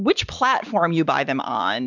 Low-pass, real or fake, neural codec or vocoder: 7.2 kHz; real; none